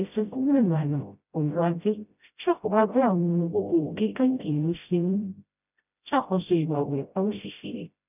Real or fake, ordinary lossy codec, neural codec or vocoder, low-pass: fake; none; codec, 16 kHz, 0.5 kbps, FreqCodec, smaller model; 3.6 kHz